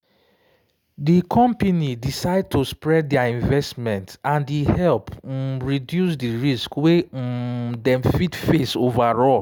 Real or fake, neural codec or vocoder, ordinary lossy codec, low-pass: real; none; none; none